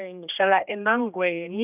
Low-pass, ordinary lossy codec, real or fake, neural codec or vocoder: 3.6 kHz; none; fake; codec, 16 kHz, 1 kbps, X-Codec, HuBERT features, trained on general audio